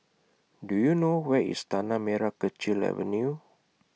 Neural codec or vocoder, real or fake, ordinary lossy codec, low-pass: none; real; none; none